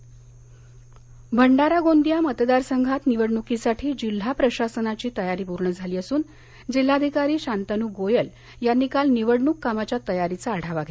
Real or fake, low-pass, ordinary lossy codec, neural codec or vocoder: real; none; none; none